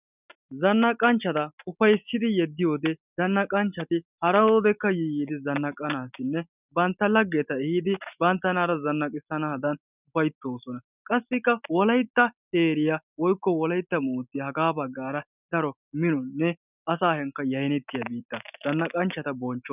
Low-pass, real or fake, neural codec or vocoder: 3.6 kHz; real; none